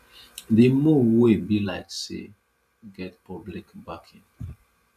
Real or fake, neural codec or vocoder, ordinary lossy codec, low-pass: fake; vocoder, 44.1 kHz, 128 mel bands every 512 samples, BigVGAN v2; none; 14.4 kHz